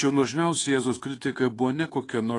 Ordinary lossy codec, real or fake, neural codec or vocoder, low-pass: AAC, 32 kbps; fake; codec, 24 kHz, 1.2 kbps, DualCodec; 10.8 kHz